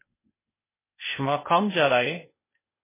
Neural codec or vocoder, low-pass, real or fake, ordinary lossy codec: codec, 16 kHz, 0.8 kbps, ZipCodec; 3.6 kHz; fake; MP3, 16 kbps